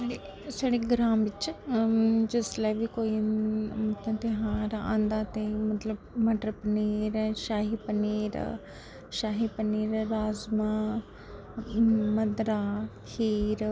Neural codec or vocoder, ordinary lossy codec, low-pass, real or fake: none; none; none; real